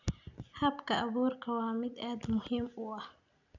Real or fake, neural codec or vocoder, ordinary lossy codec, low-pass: real; none; none; 7.2 kHz